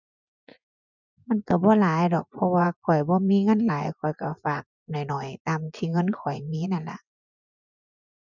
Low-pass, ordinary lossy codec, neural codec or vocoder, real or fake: 7.2 kHz; none; vocoder, 24 kHz, 100 mel bands, Vocos; fake